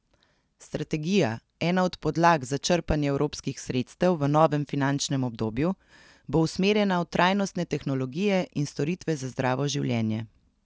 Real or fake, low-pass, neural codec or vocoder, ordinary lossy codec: real; none; none; none